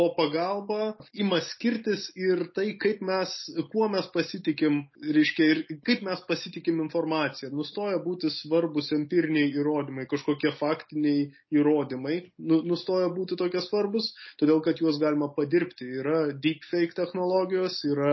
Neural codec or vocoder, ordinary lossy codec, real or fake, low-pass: none; MP3, 24 kbps; real; 7.2 kHz